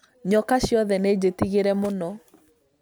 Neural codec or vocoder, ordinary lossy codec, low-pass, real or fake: none; none; none; real